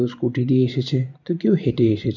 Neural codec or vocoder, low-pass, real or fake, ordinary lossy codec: vocoder, 44.1 kHz, 128 mel bands every 512 samples, BigVGAN v2; 7.2 kHz; fake; none